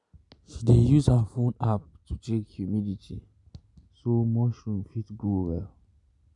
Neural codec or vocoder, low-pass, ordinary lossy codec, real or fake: none; 10.8 kHz; none; real